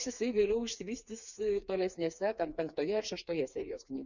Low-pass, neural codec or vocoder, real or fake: 7.2 kHz; codec, 16 kHz, 4 kbps, FreqCodec, smaller model; fake